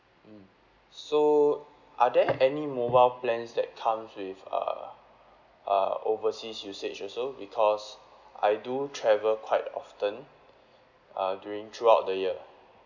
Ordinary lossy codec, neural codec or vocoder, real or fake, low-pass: none; none; real; 7.2 kHz